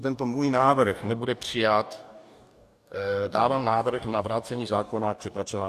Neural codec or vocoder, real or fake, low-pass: codec, 44.1 kHz, 2.6 kbps, DAC; fake; 14.4 kHz